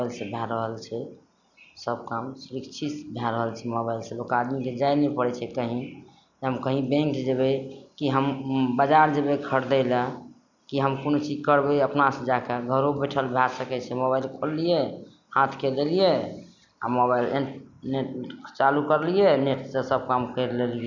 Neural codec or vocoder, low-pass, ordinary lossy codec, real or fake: none; 7.2 kHz; none; real